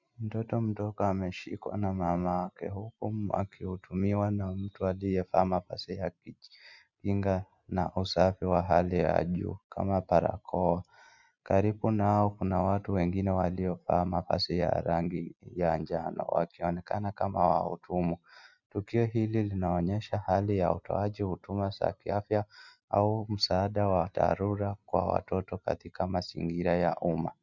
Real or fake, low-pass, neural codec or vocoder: real; 7.2 kHz; none